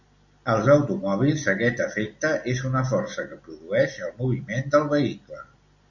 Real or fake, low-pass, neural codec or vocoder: real; 7.2 kHz; none